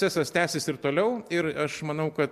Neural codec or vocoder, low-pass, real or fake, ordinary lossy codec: none; 14.4 kHz; real; MP3, 96 kbps